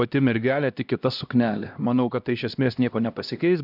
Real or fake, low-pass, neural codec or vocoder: fake; 5.4 kHz; codec, 16 kHz, 1 kbps, X-Codec, HuBERT features, trained on LibriSpeech